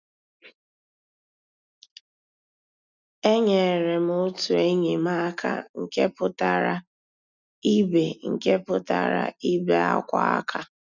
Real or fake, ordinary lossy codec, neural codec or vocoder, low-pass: real; none; none; 7.2 kHz